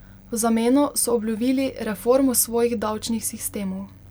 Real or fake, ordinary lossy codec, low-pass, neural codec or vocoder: real; none; none; none